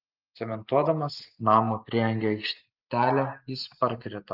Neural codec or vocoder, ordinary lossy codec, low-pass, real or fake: none; Opus, 24 kbps; 5.4 kHz; real